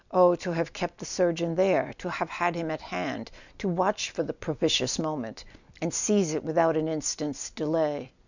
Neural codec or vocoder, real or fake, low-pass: none; real; 7.2 kHz